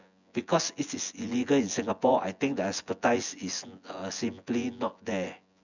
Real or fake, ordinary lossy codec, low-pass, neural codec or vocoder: fake; none; 7.2 kHz; vocoder, 24 kHz, 100 mel bands, Vocos